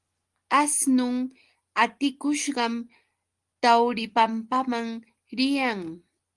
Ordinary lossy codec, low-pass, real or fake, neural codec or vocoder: Opus, 24 kbps; 10.8 kHz; real; none